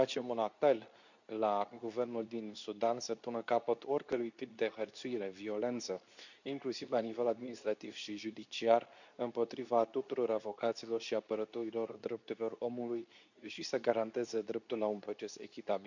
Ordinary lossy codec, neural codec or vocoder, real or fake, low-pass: none; codec, 24 kHz, 0.9 kbps, WavTokenizer, medium speech release version 2; fake; 7.2 kHz